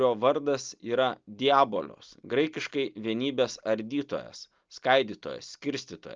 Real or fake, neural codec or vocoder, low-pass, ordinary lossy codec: real; none; 7.2 kHz; Opus, 24 kbps